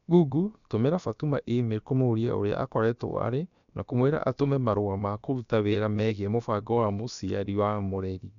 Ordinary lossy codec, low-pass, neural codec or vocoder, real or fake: none; 7.2 kHz; codec, 16 kHz, about 1 kbps, DyCAST, with the encoder's durations; fake